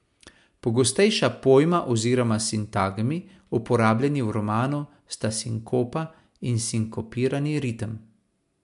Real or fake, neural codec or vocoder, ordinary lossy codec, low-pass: real; none; MP3, 64 kbps; 10.8 kHz